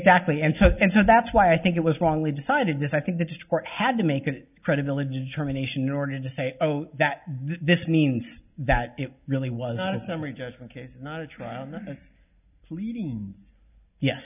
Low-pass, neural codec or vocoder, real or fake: 3.6 kHz; none; real